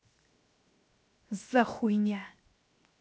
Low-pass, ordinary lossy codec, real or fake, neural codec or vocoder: none; none; fake; codec, 16 kHz, 0.7 kbps, FocalCodec